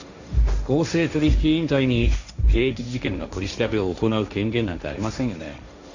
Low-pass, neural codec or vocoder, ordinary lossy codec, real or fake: 7.2 kHz; codec, 16 kHz, 1.1 kbps, Voila-Tokenizer; none; fake